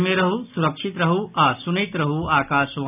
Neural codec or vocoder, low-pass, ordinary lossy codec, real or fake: none; 3.6 kHz; none; real